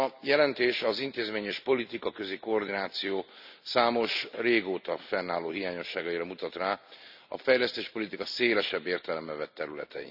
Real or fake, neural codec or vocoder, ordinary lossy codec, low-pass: real; none; none; 5.4 kHz